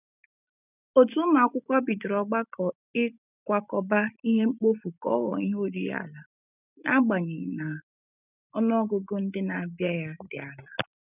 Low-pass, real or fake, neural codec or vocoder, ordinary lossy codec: 3.6 kHz; real; none; none